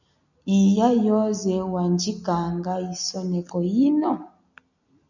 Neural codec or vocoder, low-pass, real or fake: none; 7.2 kHz; real